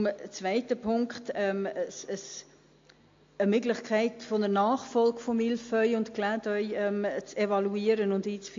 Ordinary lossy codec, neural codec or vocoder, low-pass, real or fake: AAC, 48 kbps; none; 7.2 kHz; real